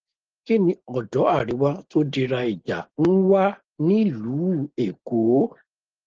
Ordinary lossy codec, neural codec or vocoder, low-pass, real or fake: Opus, 16 kbps; none; 7.2 kHz; real